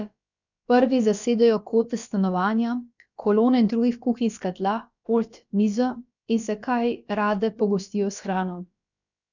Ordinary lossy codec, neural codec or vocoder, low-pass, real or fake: none; codec, 16 kHz, about 1 kbps, DyCAST, with the encoder's durations; 7.2 kHz; fake